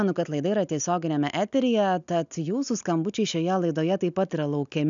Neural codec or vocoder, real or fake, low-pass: none; real; 7.2 kHz